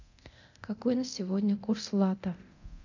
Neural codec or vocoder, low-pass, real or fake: codec, 24 kHz, 0.9 kbps, DualCodec; 7.2 kHz; fake